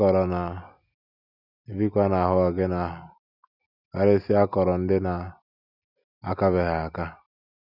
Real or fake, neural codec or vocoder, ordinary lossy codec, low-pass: real; none; none; 5.4 kHz